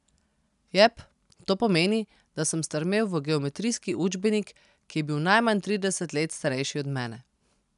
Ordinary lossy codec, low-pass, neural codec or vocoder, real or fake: none; 10.8 kHz; none; real